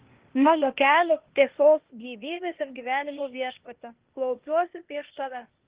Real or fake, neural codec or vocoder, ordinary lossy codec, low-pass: fake; codec, 16 kHz, 0.8 kbps, ZipCodec; Opus, 16 kbps; 3.6 kHz